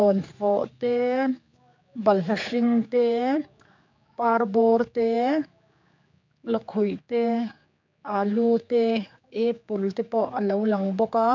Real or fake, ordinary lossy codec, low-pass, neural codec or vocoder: fake; MP3, 64 kbps; 7.2 kHz; codec, 16 kHz, 4 kbps, X-Codec, HuBERT features, trained on general audio